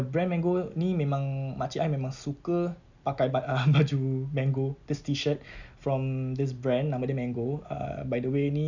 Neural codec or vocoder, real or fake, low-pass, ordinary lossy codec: none; real; 7.2 kHz; none